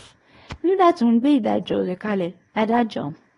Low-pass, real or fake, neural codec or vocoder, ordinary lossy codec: 10.8 kHz; fake; codec, 24 kHz, 0.9 kbps, WavTokenizer, small release; AAC, 32 kbps